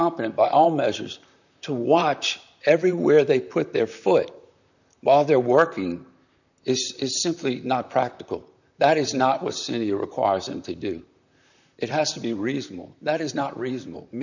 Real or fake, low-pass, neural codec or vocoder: fake; 7.2 kHz; vocoder, 44.1 kHz, 128 mel bands, Pupu-Vocoder